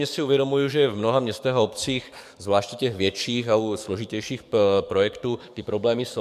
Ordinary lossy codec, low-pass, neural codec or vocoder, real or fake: MP3, 96 kbps; 14.4 kHz; codec, 44.1 kHz, 7.8 kbps, DAC; fake